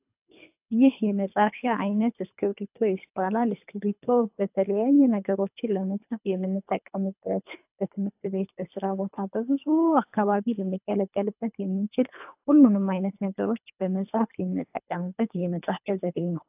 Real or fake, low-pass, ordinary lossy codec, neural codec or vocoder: fake; 3.6 kHz; AAC, 32 kbps; codec, 24 kHz, 3 kbps, HILCodec